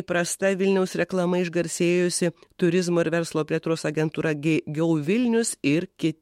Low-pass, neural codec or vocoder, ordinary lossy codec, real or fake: 19.8 kHz; autoencoder, 48 kHz, 128 numbers a frame, DAC-VAE, trained on Japanese speech; MP3, 64 kbps; fake